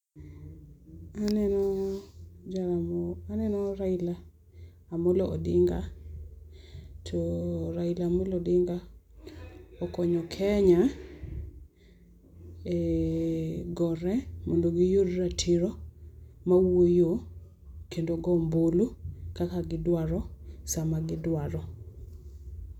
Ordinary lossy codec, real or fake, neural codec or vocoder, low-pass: none; real; none; 19.8 kHz